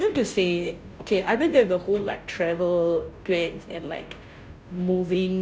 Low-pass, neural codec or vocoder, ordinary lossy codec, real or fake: none; codec, 16 kHz, 0.5 kbps, FunCodec, trained on Chinese and English, 25 frames a second; none; fake